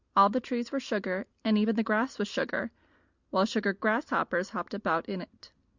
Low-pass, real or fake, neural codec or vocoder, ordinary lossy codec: 7.2 kHz; real; none; Opus, 64 kbps